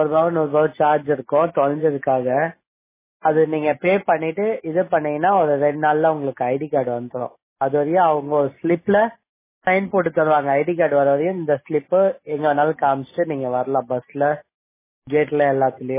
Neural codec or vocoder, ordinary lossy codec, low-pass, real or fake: none; MP3, 16 kbps; 3.6 kHz; real